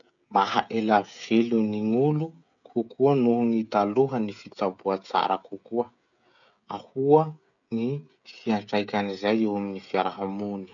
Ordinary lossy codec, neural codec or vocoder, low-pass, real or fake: none; codec, 16 kHz, 16 kbps, FreqCodec, smaller model; 7.2 kHz; fake